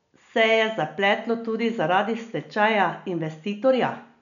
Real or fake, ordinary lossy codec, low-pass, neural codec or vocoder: real; none; 7.2 kHz; none